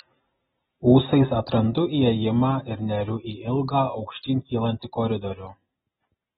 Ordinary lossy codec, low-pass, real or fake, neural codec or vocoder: AAC, 16 kbps; 7.2 kHz; real; none